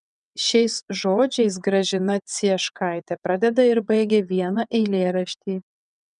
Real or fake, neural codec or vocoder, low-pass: fake; vocoder, 22.05 kHz, 80 mel bands, WaveNeXt; 9.9 kHz